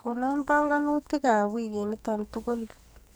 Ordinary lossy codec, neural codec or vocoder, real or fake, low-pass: none; codec, 44.1 kHz, 2.6 kbps, SNAC; fake; none